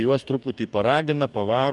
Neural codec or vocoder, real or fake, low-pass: codec, 44.1 kHz, 2.6 kbps, DAC; fake; 10.8 kHz